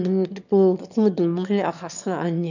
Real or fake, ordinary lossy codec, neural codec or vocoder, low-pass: fake; none; autoencoder, 22.05 kHz, a latent of 192 numbers a frame, VITS, trained on one speaker; 7.2 kHz